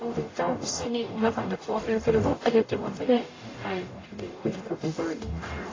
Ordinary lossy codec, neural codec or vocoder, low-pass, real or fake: AAC, 32 kbps; codec, 44.1 kHz, 0.9 kbps, DAC; 7.2 kHz; fake